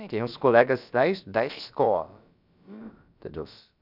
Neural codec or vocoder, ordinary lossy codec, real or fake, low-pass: codec, 16 kHz, about 1 kbps, DyCAST, with the encoder's durations; none; fake; 5.4 kHz